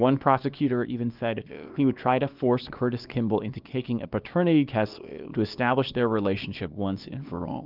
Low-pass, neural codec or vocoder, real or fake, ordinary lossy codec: 5.4 kHz; codec, 24 kHz, 0.9 kbps, WavTokenizer, small release; fake; Opus, 32 kbps